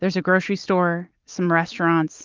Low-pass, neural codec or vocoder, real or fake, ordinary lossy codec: 7.2 kHz; none; real; Opus, 24 kbps